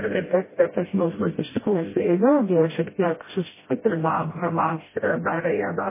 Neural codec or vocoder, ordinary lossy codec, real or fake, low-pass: codec, 16 kHz, 0.5 kbps, FreqCodec, smaller model; MP3, 16 kbps; fake; 3.6 kHz